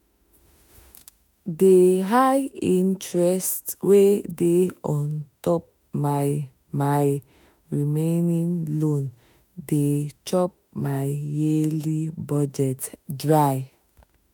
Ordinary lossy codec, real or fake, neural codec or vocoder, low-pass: none; fake; autoencoder, 48 kHz, 32 numbers a frame, DAC-VAE, trained on Japanese speech; none